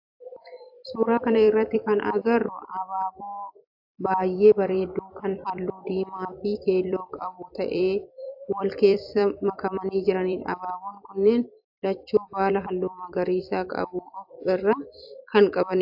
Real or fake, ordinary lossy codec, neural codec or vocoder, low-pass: fake; AAC, 48 kbps; vocoder, 44.1 kHz, 128 mel bands every 256 samples, BigVGAN v2; 5.4 kHz